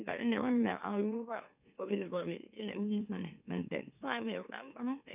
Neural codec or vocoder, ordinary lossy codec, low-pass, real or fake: autoencoder, 44.1 kHz, a latent of 192 numbers a frame, MeloTTS; none; 3.6 kHz; fake